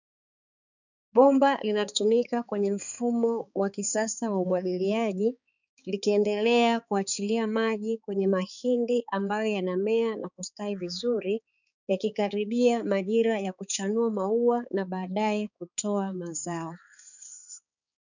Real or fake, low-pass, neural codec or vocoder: fake; 7.2 kHz; codec, 16 kHz, 4 kbps, X-Codec, HuBERT features, trained on balanced general audio